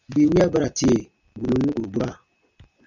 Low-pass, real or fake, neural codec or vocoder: 7.2 kHz; real; none